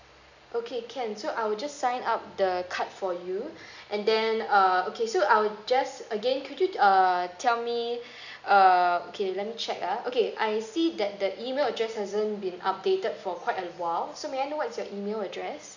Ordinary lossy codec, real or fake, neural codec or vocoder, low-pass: none; real; none; 7.2 kHz